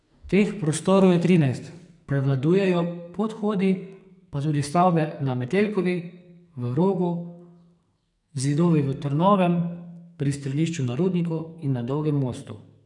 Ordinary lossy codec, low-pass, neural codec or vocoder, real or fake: none; 10.8 kHz; codec, 44.1 kHz, 2.6 kbps, SNAC; fake